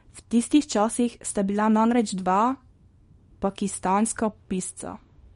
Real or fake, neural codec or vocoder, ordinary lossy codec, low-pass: fake; codec, 24 kHz, 0.9 kbps, WavTokenizer, small release; MP3, 48 kbps; 10.8 kHz